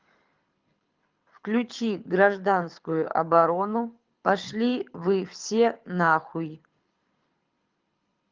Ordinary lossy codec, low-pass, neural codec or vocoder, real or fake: Opus, 32 kbps; 7.2 kHz; codec, 24 kHz, 6 kbps, HILCodec; fake